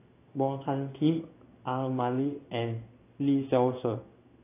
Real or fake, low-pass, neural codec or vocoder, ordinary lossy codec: fake; 3.6 kHz; codec, 16 kHz in and 24 kHz out, 1 kbps, XY-Tokenizer; AAC, 24 kbps